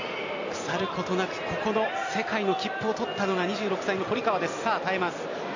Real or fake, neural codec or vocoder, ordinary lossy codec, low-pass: real; none; AAC, 48 kbps; 7.2 kHz